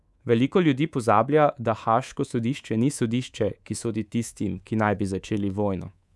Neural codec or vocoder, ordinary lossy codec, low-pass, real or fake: codec, 24 kHz, 3.1 kbps, DualCodec; none; none; fake